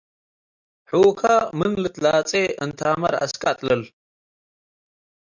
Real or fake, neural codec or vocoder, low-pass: real; none; 7.2 kHz